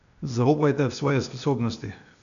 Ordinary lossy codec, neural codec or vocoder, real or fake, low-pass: none; codec, 16 kHz, 0.8 kbps, ZipCodec; fake; 7.2 kHz